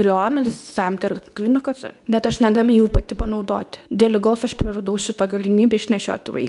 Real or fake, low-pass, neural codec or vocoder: fake; 10.8 kHz; codec, 24 kHz, 0.9 kbps, WavTokenizer, medium speech release version 2